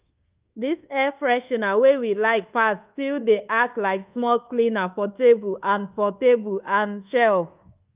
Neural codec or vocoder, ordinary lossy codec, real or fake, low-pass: codec, 24 kHz, 1.2 kbps, DualCodec; Opus, 24 kbps; fake; 3.6 kHz